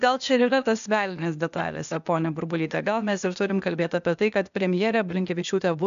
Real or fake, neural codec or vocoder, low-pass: fake; codec, 16 kHz, 0.8 kbps, ZipCodec; 7.2 kHz